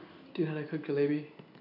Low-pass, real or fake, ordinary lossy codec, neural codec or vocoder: 5.4 kHz; real; none; none